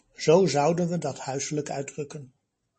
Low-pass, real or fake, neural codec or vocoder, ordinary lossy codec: 9.9 kHz; real; none; MP3, 32 kbps